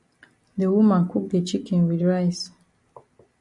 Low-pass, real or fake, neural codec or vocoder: 10.8 kHz; real; none